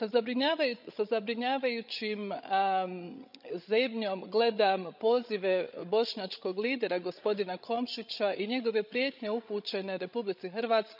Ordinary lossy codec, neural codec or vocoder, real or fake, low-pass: none; codec, 16 kHz, 16 kbps, FreqCodec, larger model; fake; 5.4 kHz